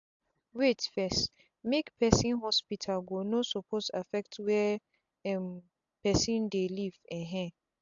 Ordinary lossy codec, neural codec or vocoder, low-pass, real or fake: AAC, 64 kbps; none; 7.2 kHz; real